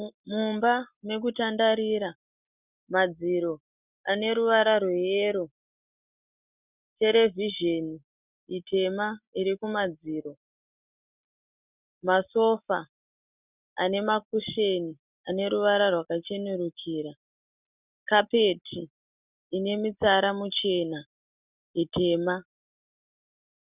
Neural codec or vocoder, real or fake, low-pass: none; real; 3.6 kHz